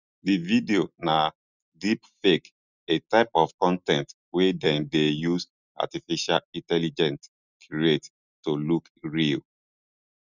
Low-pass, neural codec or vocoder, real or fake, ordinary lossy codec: 7.2 kHz; none; real; none